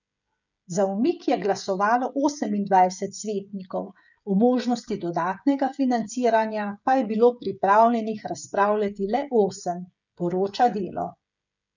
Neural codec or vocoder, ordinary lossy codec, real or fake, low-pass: codec, 16 kHz, 16 kbps, FreqCodec, smaller model; none; fake; 7.2 kHz